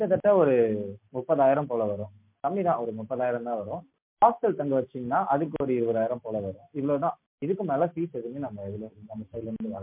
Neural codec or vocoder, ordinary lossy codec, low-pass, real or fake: none; MP3, 32 kbps; 3.6 kHz; real